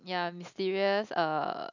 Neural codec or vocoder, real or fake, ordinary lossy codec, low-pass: none; real; none; 7.2 kHz